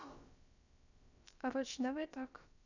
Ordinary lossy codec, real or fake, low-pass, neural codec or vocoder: none; fake; 7.2 kHz; codec, 16 kHz, about 1 kbps, DyCAST, with the encoder's durations